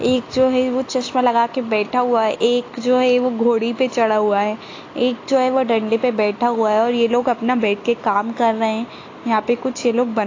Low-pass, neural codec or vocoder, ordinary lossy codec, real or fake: 7.2 kHz; none; AAC, 32 kbps; real